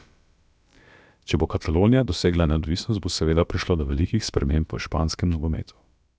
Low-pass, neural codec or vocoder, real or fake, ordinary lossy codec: none; codec, 16 kHz, about 1 kbps, DyCAST, with the encoder's durations; fake; none